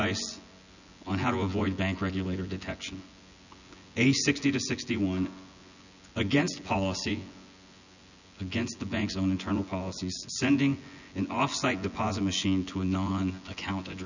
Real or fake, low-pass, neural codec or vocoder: fake; 7.2 kHz; vocoder, 24 kHz, 100 mel bands, Vocos